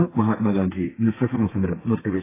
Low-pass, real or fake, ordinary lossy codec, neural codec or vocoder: 3.6 kHz; fake; AAC, 16 kbps; codec, 32 kHz, 1.9 kbps, SNAC